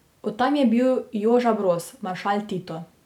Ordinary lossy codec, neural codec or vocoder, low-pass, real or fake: none; none; 19.8 kHz; real